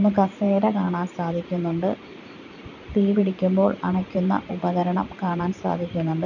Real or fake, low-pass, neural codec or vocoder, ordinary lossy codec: fake; 7.2 kHz; vocoder, 44.1 kHz, 128 mel bands every 512 samples, BigVGAN v2; none